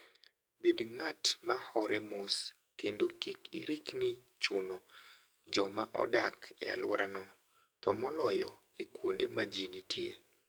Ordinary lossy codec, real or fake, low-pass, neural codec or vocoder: none; fake; none; codec, 44.1 kHz, 2.6 kbps, SNAC